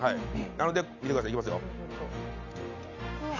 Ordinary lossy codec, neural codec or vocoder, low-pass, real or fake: none; none; 7.2 kHz; real